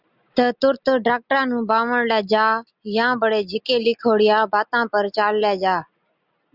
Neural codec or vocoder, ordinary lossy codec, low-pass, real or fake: none; Opus, 64 kbps; 5.4 kHz; real